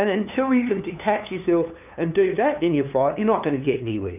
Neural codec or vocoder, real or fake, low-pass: codec, 16 kHz, 2 kbps, FunCodec, trained on LibriTTS, 25 frames a second; fake; 3.6 kHz